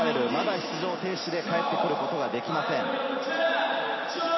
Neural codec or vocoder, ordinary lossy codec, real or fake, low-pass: none; MP3, 24 kbps; real; 7.2 kHz